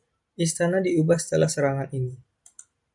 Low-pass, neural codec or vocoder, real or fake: 10.8 kHz; none; real